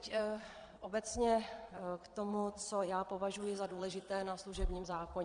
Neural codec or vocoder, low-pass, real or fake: vocoder, 22.05 kHz, 80 mel bands, Vocos; 9.9 kHz; fake